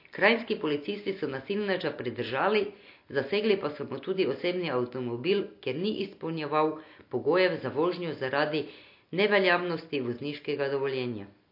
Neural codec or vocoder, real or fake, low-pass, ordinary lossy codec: none; real; 5.4 kHz; MP3, 32 kbps